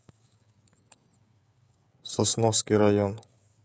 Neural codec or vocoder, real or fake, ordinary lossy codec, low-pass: codec, 16 kHz, 16 kbps, FreqCodec, smaller model; fake; none; none